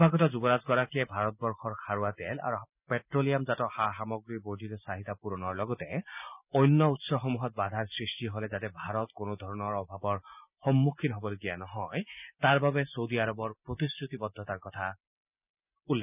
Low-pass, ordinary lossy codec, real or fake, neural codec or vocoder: 3.6 kHz; none; real; none